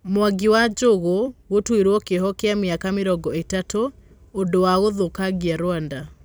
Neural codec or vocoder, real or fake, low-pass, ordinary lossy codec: vocoder, 44.1 kHz, 128 mel bands every 256 samples, BigVGAN v2; fake; none; none